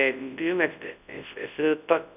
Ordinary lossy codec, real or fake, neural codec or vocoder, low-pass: none; fake; codec, 24 kHz, 0.9 kbps, WavTokenizer, large speech release; 3.6 kHz